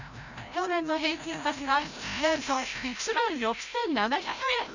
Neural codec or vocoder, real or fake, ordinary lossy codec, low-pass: codec, 16 kHz, 0.5 kbps, FreqCodec, larger model; fake; none; 7.2 kHz